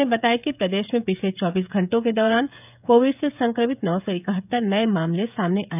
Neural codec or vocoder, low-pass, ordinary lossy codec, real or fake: codec, 16 kHz, 16 kbps, FreqCodec, smaller model; 3.6 kHz; none; fake